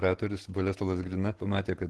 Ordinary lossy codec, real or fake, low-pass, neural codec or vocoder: Opus, 16 kbps; fake; 10.8 kHz; vocoder, 48 kHz, 128 mel bands, Vocos